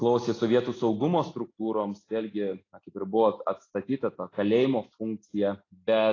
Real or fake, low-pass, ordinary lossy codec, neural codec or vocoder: real; 7.2 kHz; AAC, 32 kbps; none